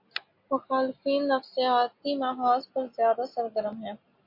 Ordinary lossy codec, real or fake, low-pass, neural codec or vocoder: MP3, 32 kbps; real; 5.4 kHz; none